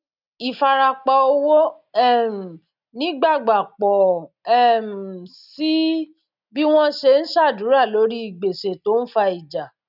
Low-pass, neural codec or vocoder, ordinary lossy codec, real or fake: 5.4 kHz; none; none; real